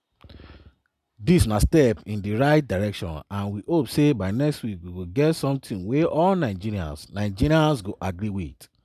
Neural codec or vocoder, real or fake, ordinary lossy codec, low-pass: none; real; none; 14.4 kHz